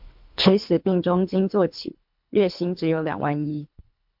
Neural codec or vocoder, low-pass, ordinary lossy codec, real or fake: codec, 24 kHz, 3 kbps, HILCodec; 5.4 kHz; MP3, 48 kbps; fake